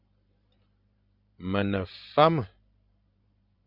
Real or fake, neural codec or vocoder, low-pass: fake; vocoder, 44.1 kHz, 128 mel bands every 512 samples, BigVGAN v2; 5.4 kHz